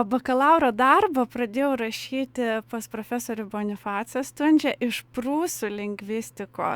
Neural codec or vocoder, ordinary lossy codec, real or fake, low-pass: autoencoder, 48 kHz, 128 numbers a frame, DAC-VAE, trained on Japanese speech; Opus, 64 kbps; fake; 19.8 kHz